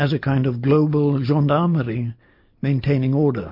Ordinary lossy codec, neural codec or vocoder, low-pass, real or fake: MP3, 32 kbps; none; 5.4 kHz; real